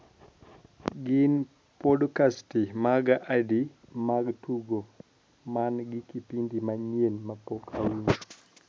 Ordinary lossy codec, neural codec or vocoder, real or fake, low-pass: none; none; real; none